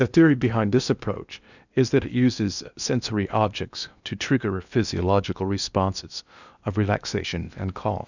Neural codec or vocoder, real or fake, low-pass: codec, 16 kHz in and 24 kHz out, 0.8 kbps, FocalCodec, streaming, 65536 codes; fake; 7.2 kHz